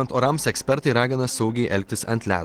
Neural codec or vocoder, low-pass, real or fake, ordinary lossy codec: none; 19.8 kHz; real; Opus, 16 kbps